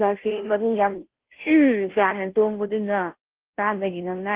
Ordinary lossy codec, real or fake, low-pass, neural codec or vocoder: Opus, 16 kbps; fake; 3.6 kHz; codec, 16 kHz, 0.5 kbps, FunCodec, trained on Chinese and English, 25 frames a second